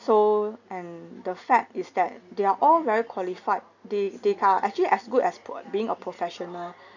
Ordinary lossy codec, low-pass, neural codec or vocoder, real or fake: none; 7.2 kHz; none; real